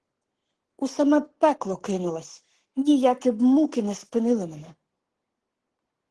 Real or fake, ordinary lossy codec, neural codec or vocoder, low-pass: fake; Opus, 16 kbps; codec, 44.1 kHz, 7.8 kbps, Pupu-Codec; 10.8 kHz